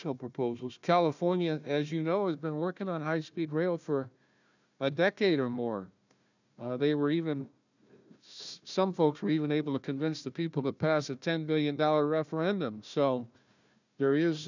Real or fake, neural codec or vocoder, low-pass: fake; codec, 16 kHz, 1 kbps, FunCodec, trained on Chinese and English, 50 frames a second; 7.2 kHz